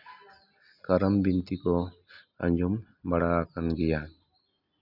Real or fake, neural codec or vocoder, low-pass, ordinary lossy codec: real; none; 5.4 kHz; none